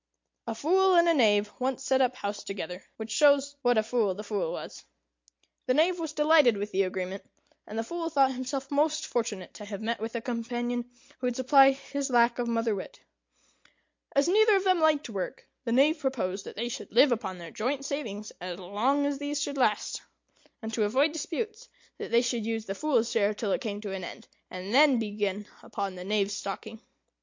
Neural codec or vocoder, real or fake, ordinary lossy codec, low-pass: none; real; MP3, 48 kbps; 7.2 kHz